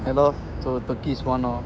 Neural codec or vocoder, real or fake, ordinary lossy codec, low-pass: codec, 16 kHz, 6 kbps, DAC; fake; none; none